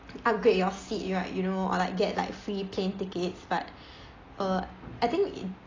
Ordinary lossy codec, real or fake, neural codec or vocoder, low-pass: AAC, 32 kbps; real; none; 7.2 kHz